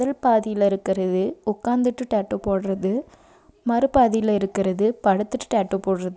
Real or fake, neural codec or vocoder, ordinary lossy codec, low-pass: real; none; none; none